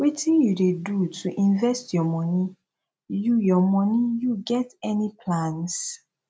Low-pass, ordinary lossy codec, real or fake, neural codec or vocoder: none; none; real; none